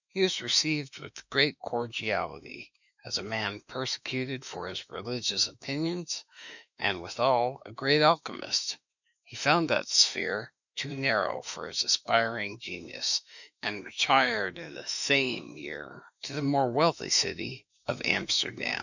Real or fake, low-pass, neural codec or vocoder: fake; 7.2 kHz; autoencoder, 48 kHz, 32 numbers a frame, DAC-VAE, trained on Japanese speech